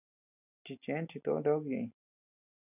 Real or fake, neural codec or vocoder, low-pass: real; none; 3.6 kHz